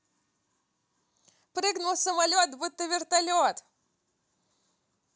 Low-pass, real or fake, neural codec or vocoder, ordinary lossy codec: none; real; none; none